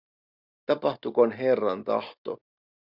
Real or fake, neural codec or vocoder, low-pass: real; none; 5.4 kHz